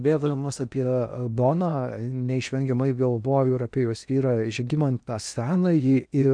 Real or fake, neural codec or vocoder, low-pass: fake; codec, 16 kHz in and 24 kHz out, 0.8 kbps, FocalCodec, streaming, 65536 codes; 9.9 kHz